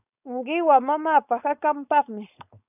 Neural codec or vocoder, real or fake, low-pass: codec, 16 kHz, 4.8 kbps, FACodec; fake; 3.6 kHz